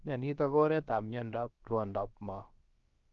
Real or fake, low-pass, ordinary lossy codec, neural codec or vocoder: fake; 7.2 kHz; Opus, 32 kbps; codec, 16 kHz, about 1 kbps, DyCAST, with the encoder's durations